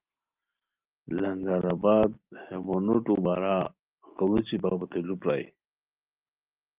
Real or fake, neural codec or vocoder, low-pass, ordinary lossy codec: real; none; 3.6 kHz; Opus, 24 kbps